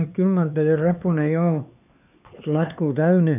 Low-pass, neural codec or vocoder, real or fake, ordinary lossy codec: 3.6 kHz; codec, 16 kHz, 4 kbps, X-Codec, HuBERT features, trained on LibriSpeech; fake; none